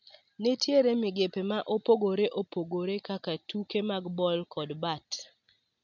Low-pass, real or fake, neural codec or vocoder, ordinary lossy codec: 7.2 kHz; real; none; none